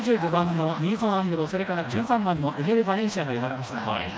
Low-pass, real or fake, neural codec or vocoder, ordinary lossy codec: none; fake; codec, 16 kHz, 1 kbps, FreqCodec, smaller model; none